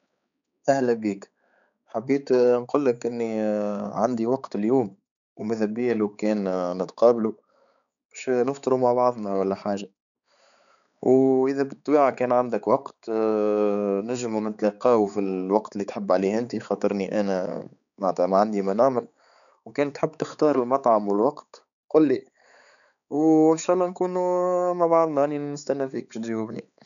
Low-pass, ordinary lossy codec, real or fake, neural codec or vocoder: 7.2 kHz; none; fake; codec, 16 kHz, 4 kbps, X-Codec, HuBERT features, trained on balanced general audio